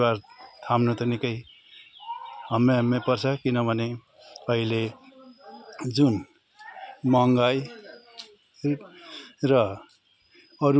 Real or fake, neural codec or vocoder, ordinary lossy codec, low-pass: real; none; none; none